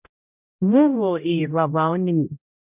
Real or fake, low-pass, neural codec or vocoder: fake; 3.6 kHz; codec, 16 kHz, 0.5 kbps, X-Codec, HuBERT features, trained on general audio